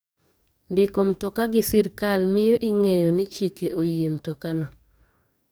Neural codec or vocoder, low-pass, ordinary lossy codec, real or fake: codec, 44.1 kHz, 2.6 kbps, DAC; none; none; fake